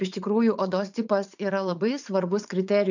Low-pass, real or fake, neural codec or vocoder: 7.2 kHz; fake; vocoder, 44.1 kHz, 80 mel bands, Vocos